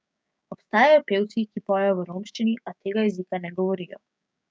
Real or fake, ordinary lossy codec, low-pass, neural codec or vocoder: fake; none; none; codec, 16 kHz, 6 kbps, DAC